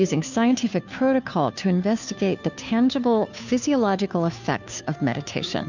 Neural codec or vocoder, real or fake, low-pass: codec, 16 kHz, 2 kbps, FunCodec, trained on Chinese and English, 25 frames a second; fake; 7.2 kHz